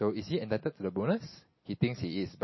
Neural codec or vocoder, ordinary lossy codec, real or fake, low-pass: none; MP3, 24 kbps; real; 7.2 kHz